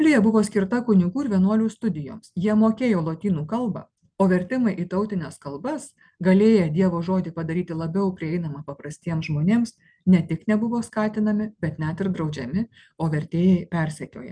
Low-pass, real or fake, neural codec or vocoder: 9.9 kHz; real; none